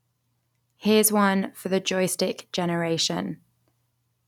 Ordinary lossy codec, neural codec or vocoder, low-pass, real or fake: none; none; 19.8 kHz; real